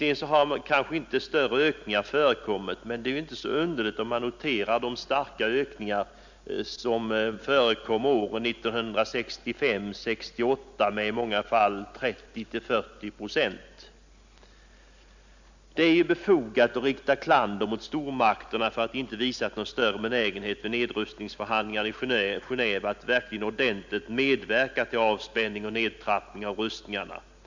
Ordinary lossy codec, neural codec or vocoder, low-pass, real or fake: Opus, 64 kbps; none; 7.2 kHz; real